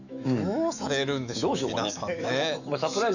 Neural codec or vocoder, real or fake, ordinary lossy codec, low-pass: autoencoder, 48 kHz, 128 numbers a frame, DAC-VAE, trained on Japanese speech; fake; none; 7.2 kHz